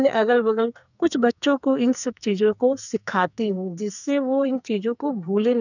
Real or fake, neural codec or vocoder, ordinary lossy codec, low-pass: fake; codec, 44.1 kHz, 2.6 kbps, SNAC; none; 7.2 kHz